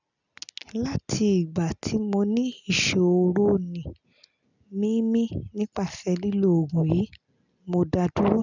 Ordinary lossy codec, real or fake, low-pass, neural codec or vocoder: none; real; 7.2 kHz; none